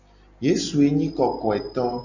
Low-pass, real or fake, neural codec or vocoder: 7.2 kHz; real; none